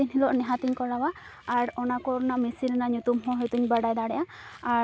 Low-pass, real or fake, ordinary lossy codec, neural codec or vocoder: none; real; none; none